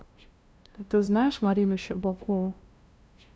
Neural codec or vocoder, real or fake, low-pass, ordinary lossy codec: codec, 16 kHz, 0.5 kbps, FunCodec, trained on LibriTTS, 25 frames a second; fake; none; none